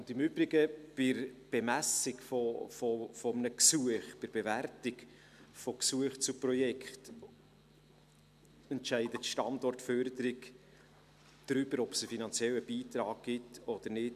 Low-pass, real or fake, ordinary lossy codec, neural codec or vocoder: 14.4 kHz; real; none; none